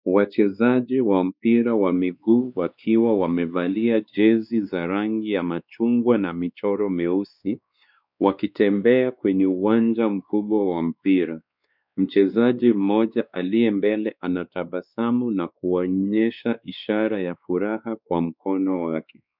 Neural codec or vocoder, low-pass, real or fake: codec, 16 kHz, 2 kbps, X-Codec, WavLM features, trained on Multilingual LibriSpeech; 5.4 kHz; fake